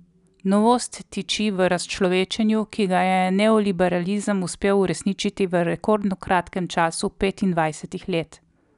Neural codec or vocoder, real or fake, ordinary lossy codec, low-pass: none; real; none; 10.8 kHz